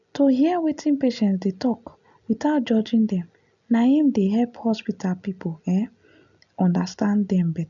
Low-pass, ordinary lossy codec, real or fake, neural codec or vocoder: 7.2 kHz; none; real; none